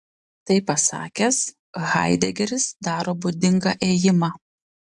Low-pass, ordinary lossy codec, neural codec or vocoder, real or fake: 10.8 kHz; AAC, 64 kbps; none; real